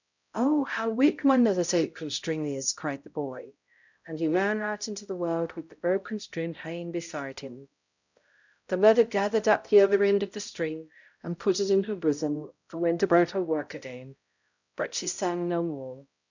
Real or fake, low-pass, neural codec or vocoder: fake; 7.2 kHz; codec, 16 kHz, 0.5 kbps, X-Codec, HuBERT features, trained on balanced general audio